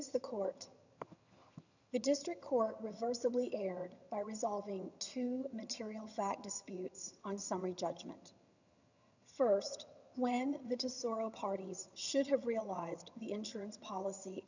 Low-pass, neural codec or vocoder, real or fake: 7.2 kHz; vocoder, 22.05 kHz, 80 mel bands, HiFi-GAN; fake